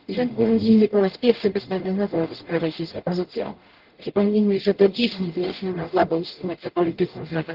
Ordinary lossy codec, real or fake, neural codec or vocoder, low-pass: Opus, 16 kbps; fake; codec, 44.1 kHz, 0.9 kbps, DAC; 5.4 kHz